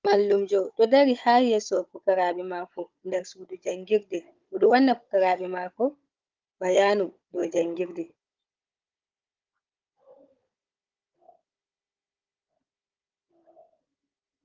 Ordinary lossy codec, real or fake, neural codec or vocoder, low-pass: Opus, 24 kbps; fake; codec, 16 kHz, 16 kbps, FunCodec, trained on Chinese and English, 50 frames a second; 7.2 kHz